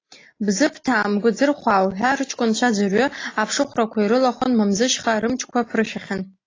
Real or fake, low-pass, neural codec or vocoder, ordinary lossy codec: real; 7.2 kHz; none; AAC, 32 kbps